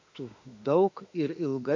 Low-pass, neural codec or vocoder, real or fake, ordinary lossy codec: 7.2 kHz; autoencoder, 48 kHz, 32 numbers a frame, DAC-VAE, trained on Japanese speech; fake; MP3, 48 kbps